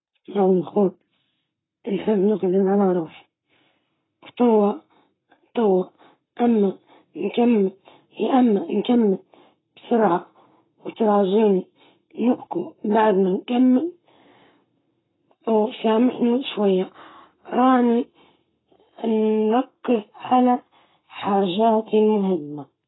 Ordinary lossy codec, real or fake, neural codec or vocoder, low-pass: AAC, 16 kbps; fake; codec, 44.1 kHz, 7.8 kbps, Pupu-Codec; 7.2 kHz